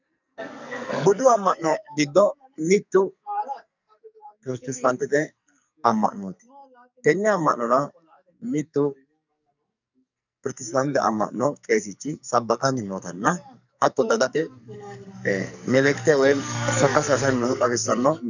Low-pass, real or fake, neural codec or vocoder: 7.2 kHz; fake; codec, 44.1 kHz, 2.6 kbps, SNAC